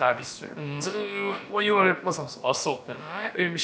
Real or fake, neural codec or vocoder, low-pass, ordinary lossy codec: fake; codec, 16 kHz, about 1 kbps, DyCAST, with the encoder's durations; none; none